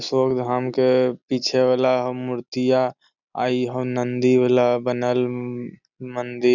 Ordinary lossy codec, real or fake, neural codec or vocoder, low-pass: none; real; none; 7.2 kHz